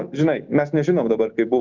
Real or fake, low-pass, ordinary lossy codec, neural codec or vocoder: real; 7.2 kHz; Opus, 32 kbps; none